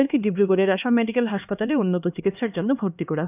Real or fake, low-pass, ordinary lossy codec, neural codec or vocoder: fake; 3.6 kHz; none; codec, 16 kHz, 2 kbps, X-Codec, HuBERT features, trained on LibriSpeech